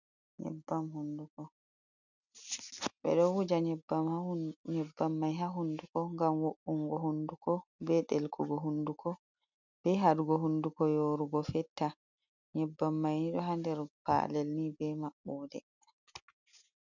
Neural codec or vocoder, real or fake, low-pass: none; real; 7.2 kHz